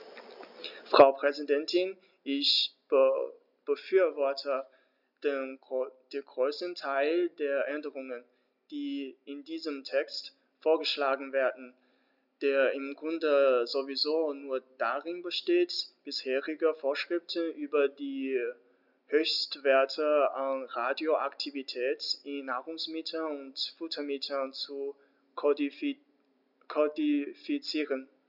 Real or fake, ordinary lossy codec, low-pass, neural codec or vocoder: real; none; 5.4 kHz; none